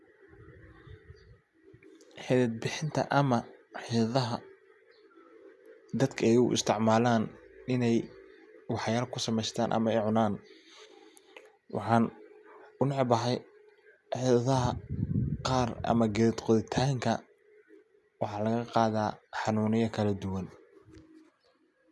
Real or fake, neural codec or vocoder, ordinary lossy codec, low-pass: real; none; none; none